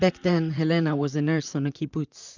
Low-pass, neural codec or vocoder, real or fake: 7.2 kHz; vocoder, 44.1 kHz, 128 mel bands, Pupu-Vocoder; fake